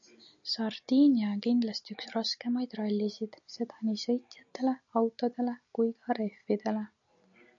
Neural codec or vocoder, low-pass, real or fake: none; 7.2 kHz; real